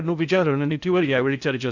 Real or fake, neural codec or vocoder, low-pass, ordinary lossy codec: fake; codec, 16 kHz in and 24 kHz out, 0.6 kbps, FocalCodec, streaming, 2048 codes; 7.2 kHz; none